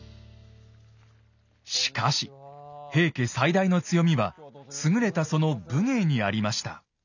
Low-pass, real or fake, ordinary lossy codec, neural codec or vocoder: 7.2 kHz; real; none; none